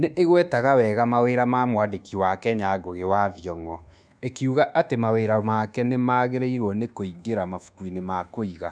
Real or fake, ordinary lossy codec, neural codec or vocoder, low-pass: fake; none; codec, 24 kHz, 1.2 kbps, DualCodec; 9.9 kHz